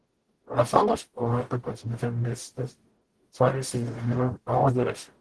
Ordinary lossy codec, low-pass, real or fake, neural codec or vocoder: Opus, 16 kbps; 10.8 kHz; fake; codec, 44.1 kHz, 0.9 kbps, DAC